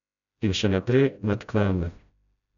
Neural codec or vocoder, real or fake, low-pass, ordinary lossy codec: codec, 16 kHz, 0.5 kbps, FreqCodec, smaller model; fake; 7.2 kHz; none